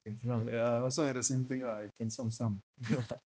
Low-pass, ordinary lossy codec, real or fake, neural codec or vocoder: none; none; fake; codec, 16 kHz, 1 kbps, X-Codec, HuBERT features, trained on balanced general audio